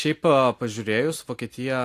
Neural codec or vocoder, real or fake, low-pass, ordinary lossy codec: none; real; 14.4 kHz; AAC, 64 kbps